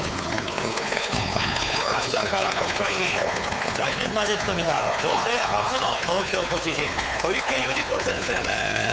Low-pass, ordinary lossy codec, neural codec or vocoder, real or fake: none; none; codec, 16 kHz, 4 kbps, X-Codec, WavLM features, trained on Multilingual LibriSpeech; fake